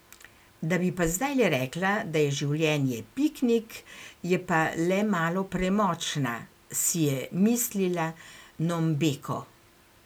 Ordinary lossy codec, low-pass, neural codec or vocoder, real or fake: none; none; none; real